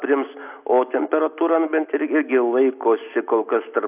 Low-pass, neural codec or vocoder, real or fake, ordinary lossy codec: 3.6 kHz; none; real; AAC, 32 kbps